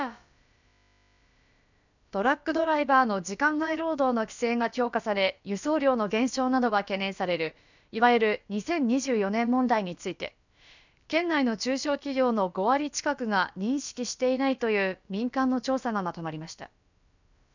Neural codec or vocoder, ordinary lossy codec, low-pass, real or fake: codec, 16 kHz, about 1 kbps, DyCAST, with the encoder's durations; none; 7.2 kHz; fake